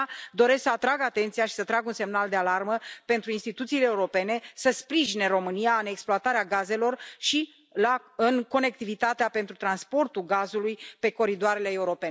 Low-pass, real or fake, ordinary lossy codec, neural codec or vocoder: none; real; none; none